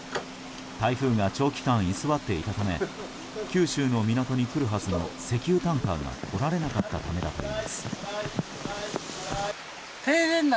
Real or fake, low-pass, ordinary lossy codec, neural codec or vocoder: real; none; none; none